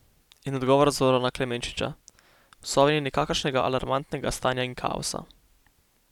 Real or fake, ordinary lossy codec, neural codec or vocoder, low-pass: real; none; none; 19.8 kHz